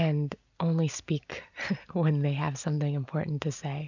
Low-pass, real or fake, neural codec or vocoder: 7.2 kHz; real; none